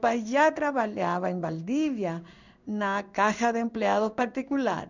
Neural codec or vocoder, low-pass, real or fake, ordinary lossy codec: codec, 16 kHz in and 24 kHz out, 1 kbps, XY-Tokenizer; 7.2 kHz; fake; none